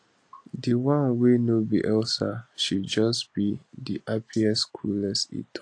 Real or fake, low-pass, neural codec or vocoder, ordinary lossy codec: real; 9.9 kHz; none; AAC, 48 kbps